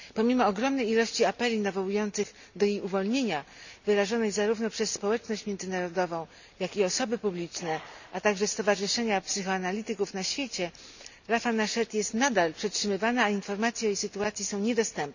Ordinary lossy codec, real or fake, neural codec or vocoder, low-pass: none; real; none; 7.2 kHz